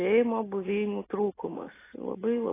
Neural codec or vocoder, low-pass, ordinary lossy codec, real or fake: none; 3.6 kHz; AAC, 16 kbps; real